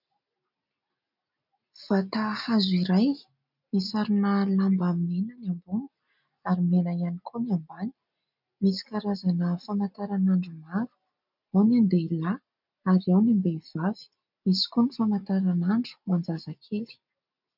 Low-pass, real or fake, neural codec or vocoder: 5.4 kHz; real; none